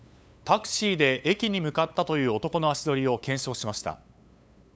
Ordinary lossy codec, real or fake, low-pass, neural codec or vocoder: none; fake; none; codec, 16 kHz, 8 kbps, FunCodec, trained on LibriTTS, 25 frames a second